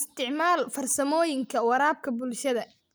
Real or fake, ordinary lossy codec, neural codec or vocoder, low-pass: real; none; none; none